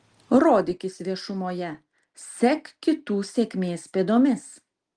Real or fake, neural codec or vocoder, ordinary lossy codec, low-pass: real; none; Opus, 24 kbps; 9.9 kHz